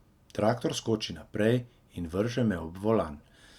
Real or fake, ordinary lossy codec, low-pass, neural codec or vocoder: real; Opus, 64 kbps; 19.8 kHz; none